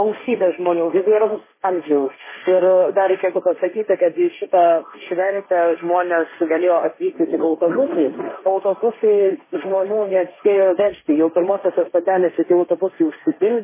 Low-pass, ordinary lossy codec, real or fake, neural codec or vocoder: 3.6 kHz; MP3, 16 kbps; fake; codec, 16 kHz, 1.1 kbps, Voila-Tokenizer